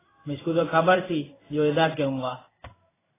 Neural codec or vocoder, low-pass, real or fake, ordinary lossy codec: codec, 16 kHz in and 24 kHz out, 1 kbps, XY-Tokenizer; 3.6 kHz; fake; AAC, 16 kbps